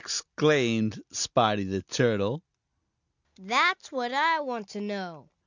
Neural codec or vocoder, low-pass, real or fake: none; 7.2 kHz; real